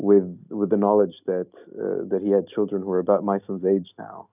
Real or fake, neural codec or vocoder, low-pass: real; none; 3.6 kHz